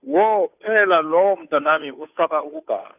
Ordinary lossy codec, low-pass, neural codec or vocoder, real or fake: none; 3.6 kHz; codec, 16 kHz, 2 kbps, FunCodec, trained on Chinese and English, 25 frames a second; fake